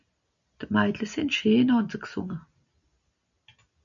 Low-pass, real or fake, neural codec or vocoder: 7.2 kHz; real; none